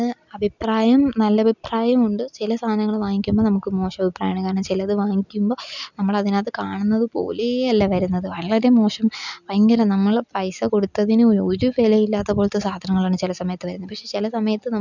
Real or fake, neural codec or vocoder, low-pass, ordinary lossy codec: real; none; 7.2 kHz; none